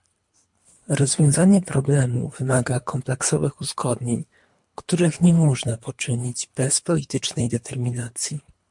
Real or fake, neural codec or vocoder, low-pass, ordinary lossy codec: fake; codec, 24 kHz, 3 kbps, HILCodec; 10.8 kHz; MP3, 64 kbps